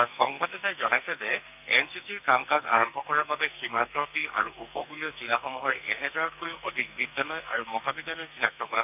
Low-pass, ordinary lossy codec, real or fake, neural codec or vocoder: 3.6 kHz; none; fake; codec, 44.1 kHz, 2.6 kbps, SNAC